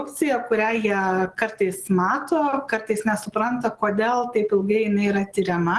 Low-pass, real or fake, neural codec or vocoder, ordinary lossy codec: 10.8 kHz; real; none; Opus, 16 kbps